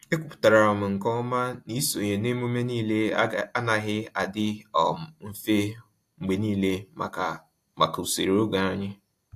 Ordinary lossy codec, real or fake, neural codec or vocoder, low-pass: AAC, 64 kbps; real; none; 14.4 kHz